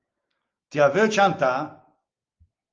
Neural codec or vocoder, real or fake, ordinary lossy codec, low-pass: none; real; Opus, 32 kbps; 7.2 kHz